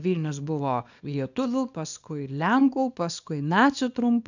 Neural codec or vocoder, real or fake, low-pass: codec, 24 kHz, 0.9 kbps, WavTokenizer, small release; fake; 7.2 kHz